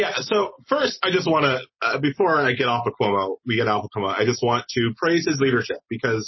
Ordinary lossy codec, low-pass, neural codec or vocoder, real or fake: MP3, 24 kbps; 7.2 kHz; none; real